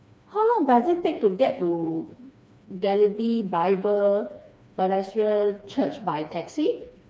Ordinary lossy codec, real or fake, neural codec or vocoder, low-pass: none; fake; codec, 16 kHz, 2 kbps, FreqCodec, smaller model; none